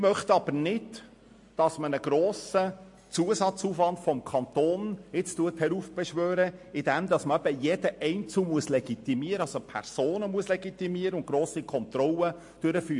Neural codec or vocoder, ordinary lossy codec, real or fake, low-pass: none; MP3, 48 kbps; real; 10.8 kHz